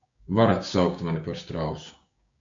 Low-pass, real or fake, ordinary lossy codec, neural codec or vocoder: 7.2 kHz; fake; AAC, 48 kbps; codec, 16 kHz, 6 kbps, DAC